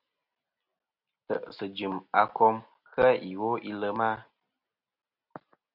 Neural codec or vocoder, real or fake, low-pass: none; real; 5.4 kHz